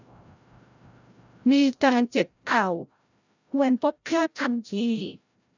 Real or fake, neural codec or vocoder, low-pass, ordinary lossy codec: fake; codec, 16 kHz, 0.5 kbps, FreqCodec, larger model; 7.2 kHz; none